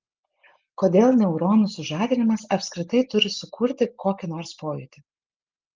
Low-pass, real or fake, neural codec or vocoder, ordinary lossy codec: 7.2 kHz; real; none; Opus, 24 kbps